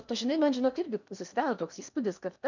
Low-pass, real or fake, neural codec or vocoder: 7.2 kHz; fake; codec, 16 kHz in and 24 kHz out, 0.8 kbps, FocalCodec, streaming, 65536 codes